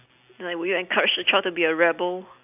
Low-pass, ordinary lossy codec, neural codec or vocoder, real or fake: 3.6 kHz; none; none; real